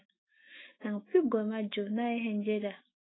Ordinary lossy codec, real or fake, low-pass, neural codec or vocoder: AAC, 16 kbps; fake; 7.2 kHz; autoencoder, 48 kHz, 128 numbers a frame, DAC-VAE, trained on Japanese speech